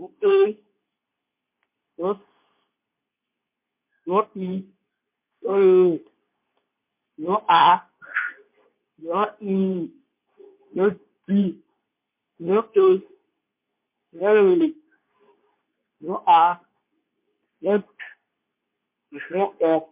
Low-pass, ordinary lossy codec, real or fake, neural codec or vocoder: 3.6 kHz; MP3, 32 kbps; fake; codec, 24 kHz, 0.9 kbps, WavTokenizer, medium speech release version 2